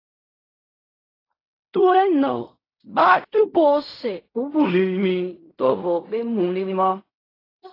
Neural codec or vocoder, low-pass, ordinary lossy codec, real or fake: codec, 16 kHz in and 24 kHz out, 0.4 kbps, LongCat-Audio-Codec, fine tuned four codebook decoder; 5.4 kHz; AAC, 24 kbps; fake